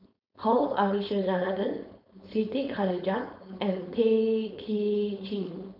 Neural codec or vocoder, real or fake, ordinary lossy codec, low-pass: codec, 16 kHz, 4.8 kbps, FACodec; fake; none; 5.4 kHz